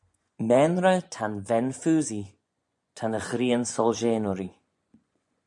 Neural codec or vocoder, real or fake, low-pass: vocoder, 24 kHz, 100 mel bands, Vocos; fake; 10.8 kHz